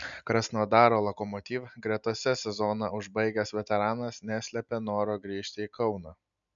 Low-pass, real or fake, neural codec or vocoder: 7.2 kHz; real; none